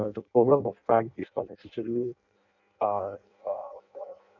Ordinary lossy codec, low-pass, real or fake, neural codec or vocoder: none; 7.2 kHz; fake; codec, 16 kHz in and 24 kHz out, 0.6 kbps, FireRedTTS-2 codec